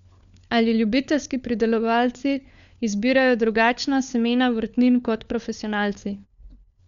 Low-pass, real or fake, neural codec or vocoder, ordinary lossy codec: 7.2 kHz; fake; codec, 16 kHz, 4 kbps, FunCodec, trained on LibriTTS, 50 frames a second; none